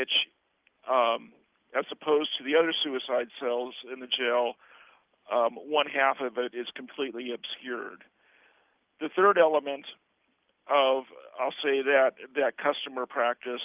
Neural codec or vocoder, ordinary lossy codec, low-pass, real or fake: none; Opus, 24 kbps; 3.6 kHz; real